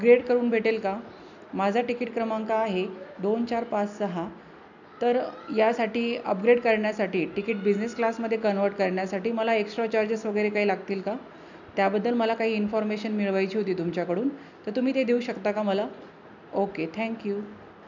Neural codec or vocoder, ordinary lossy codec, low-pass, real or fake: none; none; 7.2 kHz; real